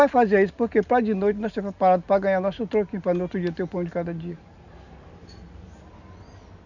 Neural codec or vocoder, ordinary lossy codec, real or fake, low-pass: none; none; real; 7.2 kHz